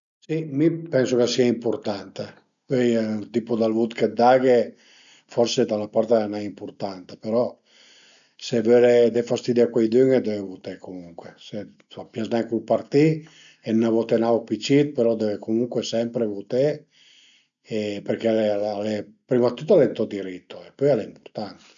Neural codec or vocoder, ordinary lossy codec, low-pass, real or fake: none; none; 7.2 kHz; real